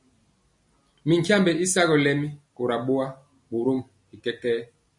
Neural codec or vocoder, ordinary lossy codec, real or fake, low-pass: none; MP3, 48 kbps; real; 10.8 kHz